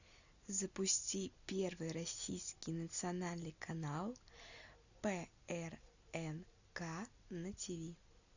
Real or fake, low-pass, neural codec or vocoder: real; 7.2 kHz; none